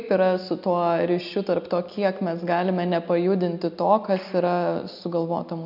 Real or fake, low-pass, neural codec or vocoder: real; 5.4 kHz; none